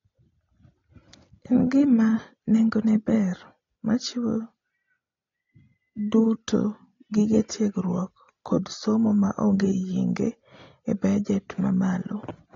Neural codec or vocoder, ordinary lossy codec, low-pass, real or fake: none; AAC, 32 kbps; 7.2 kHz; real